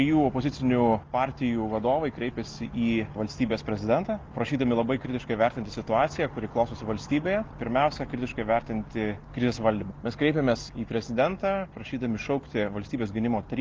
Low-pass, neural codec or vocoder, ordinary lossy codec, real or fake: 7.2 kHz; none; Opus, 32 kbps; real